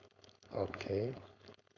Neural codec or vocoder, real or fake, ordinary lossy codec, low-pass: codec, 16 kHz, 4.8 kbps, FACodec; fake; none; 7.2 kHz